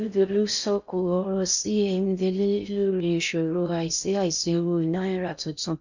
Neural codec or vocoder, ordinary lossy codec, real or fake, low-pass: codec, 16 kHz in and 24 kHz out, 0.6 kbps, FocalCodec, streaming, 4096 codes; none; fake; 7.2 kHz